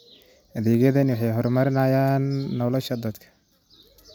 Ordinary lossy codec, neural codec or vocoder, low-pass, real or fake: none; none; none; real